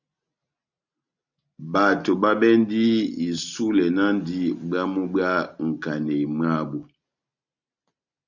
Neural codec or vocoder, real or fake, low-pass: none; real; 7.2 kHz